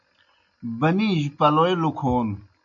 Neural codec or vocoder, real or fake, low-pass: none; real; 7.2 kHz